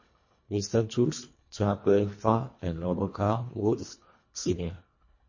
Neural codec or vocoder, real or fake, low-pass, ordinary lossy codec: codec, 24 kHz, 1.5 kbps, HILCodec; fake; 7.2 kHz; MP3, 32 kbps